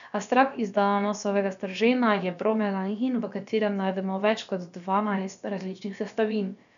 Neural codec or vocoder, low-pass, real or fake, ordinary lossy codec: codec, 16 kHz, about 1 kbps, DyCAST, with the encoder's durations; 7.2 kHz; fake; none